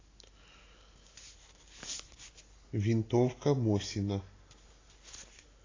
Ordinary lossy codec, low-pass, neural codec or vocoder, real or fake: AAC, 32 kbps; 7.2 kHz; none; real